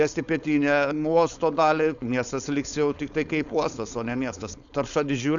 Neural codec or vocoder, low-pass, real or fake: codec, 16 kHz, 4.8 kbps, FACodec; 7.2 kHz; fake